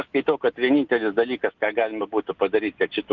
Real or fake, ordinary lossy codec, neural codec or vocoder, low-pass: real; Opus, 32 kbps; none; 7.2 kHz